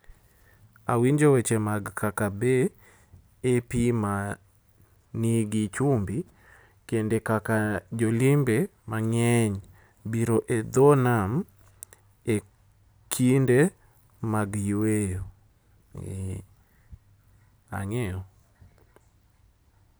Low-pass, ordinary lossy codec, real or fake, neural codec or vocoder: none; none; real; none